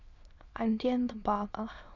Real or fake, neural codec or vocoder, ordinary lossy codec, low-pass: fake; autoencoder, 22.05 kHz, a latent of 192 numbers a frame, VITS, trained on many speakers; none; 7.2 kHz